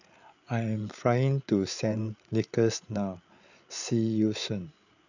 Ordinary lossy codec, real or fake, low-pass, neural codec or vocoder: none; fake; 7.2 kHz; codec, 16 kHz, 8 kbps, FreqCodec, larger model